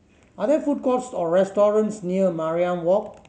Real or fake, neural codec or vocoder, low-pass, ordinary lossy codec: real; none; none; none